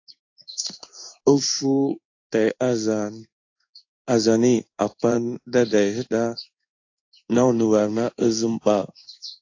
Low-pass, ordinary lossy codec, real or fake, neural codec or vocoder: 7.2 kHz; AAC, 48 kbps; fake; codec, 16 kHz in and 24 kHz out, 1 kbps, XY-Tokenizer